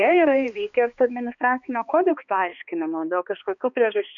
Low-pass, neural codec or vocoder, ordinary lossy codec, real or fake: 7.2 kHz; codec, 16 kHz, 2 kbps, X-Codec, HuBERT features, trained on balanced general audio; MP3, 64 kbps; fake